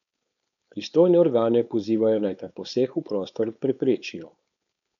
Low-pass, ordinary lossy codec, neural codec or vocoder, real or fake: 7.2 kHz; none; codec, 16 kHz, 4.8 kbps, FACodec; fake